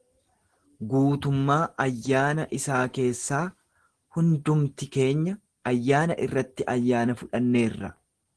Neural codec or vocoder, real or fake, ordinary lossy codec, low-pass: none; real; Opus, 16 kbps; 10.8 kHz